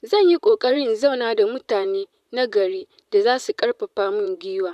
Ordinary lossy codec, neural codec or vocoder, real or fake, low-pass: none; vocoder, 44.1 kHz, 128 mel bands, Pupu-Vocoder; fake; 14.4 kHz